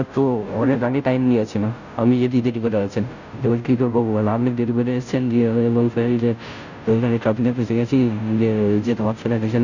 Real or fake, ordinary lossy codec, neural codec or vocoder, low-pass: fake; none; codec, 16 kHz, 0.5 kbps, FunCodec, trained on Chinese and English, 25 frames a second; 7.2 kHz